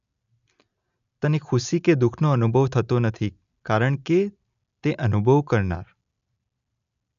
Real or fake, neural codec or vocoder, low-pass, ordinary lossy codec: real; none; 7.2 kHz; none